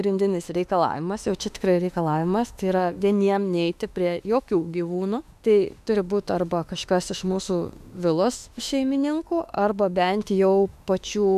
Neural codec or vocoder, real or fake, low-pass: autoencoder, 48 kHz, 32 numbers a frame, DAC-VAE, trained on Japanese speech; fake; 14.4 kHz